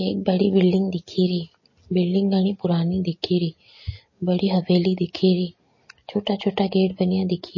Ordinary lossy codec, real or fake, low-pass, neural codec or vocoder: MP3, 32 kbps; real; 7.2 kHz; none